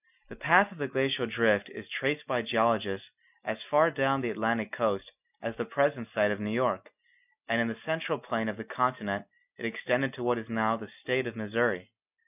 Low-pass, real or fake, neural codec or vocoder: 3.6 kHz; real; none